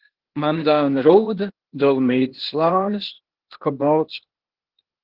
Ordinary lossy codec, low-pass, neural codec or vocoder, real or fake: Opus, 16 kbps; 5.4 kHz; codec, 16 kHz, 0.8 kbps, ZipCodec; fake